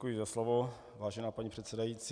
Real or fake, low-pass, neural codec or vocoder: real; 9.9 kHz; none